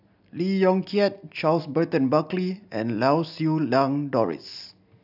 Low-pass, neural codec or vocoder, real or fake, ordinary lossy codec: 5.4 kHz; none; real; AAC, 48 kbps